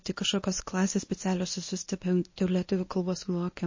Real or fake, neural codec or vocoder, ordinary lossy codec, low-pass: fake; codec, 24 kHz, 0.9 kbps, WavTokenizer, medium speech release version 1; MP3, 32 kbps; 7.2 kHz